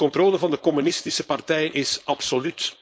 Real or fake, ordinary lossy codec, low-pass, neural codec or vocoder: fake; none; none; codec, 16 kHz, 4.8 kbps, FACodec